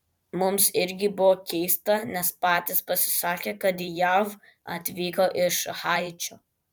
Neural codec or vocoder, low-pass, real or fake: vocoder, 44.1 kHz, 128 mel bands every 512 samples, BigVGAN v2; 19.8 kHz; fake